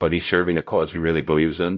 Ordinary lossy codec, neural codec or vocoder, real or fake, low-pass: MP3, 48 kbps; codec, 16 kHz, 0.5 kbps, X-Codec, HuBERT features, trained on LibriSpeech; fake; 7.2 kHz